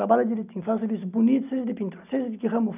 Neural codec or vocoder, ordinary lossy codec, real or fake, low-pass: none; none; real; 3.6 kHz